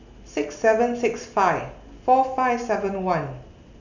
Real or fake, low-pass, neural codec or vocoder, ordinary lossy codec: real; 7.2 kHz; none; none